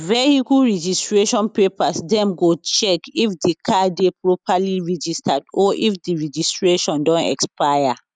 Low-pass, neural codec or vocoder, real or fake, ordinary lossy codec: 9.9 kHz; none; real; none